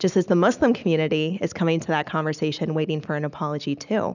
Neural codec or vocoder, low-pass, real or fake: none; 7.2 kHz; real